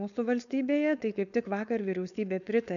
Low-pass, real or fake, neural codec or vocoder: 7.2 kHz; fake; codec, 16 kHz, 4.8 kbps, FACodec